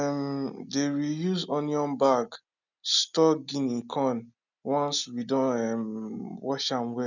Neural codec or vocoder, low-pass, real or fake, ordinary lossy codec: none; 7.2 kHz; real; none